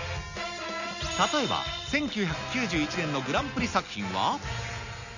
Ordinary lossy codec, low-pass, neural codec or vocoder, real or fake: none; 7.2 kHz; none; real